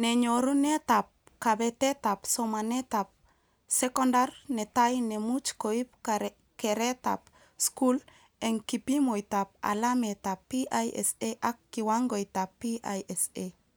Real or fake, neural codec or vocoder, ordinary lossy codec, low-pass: real; none; none; none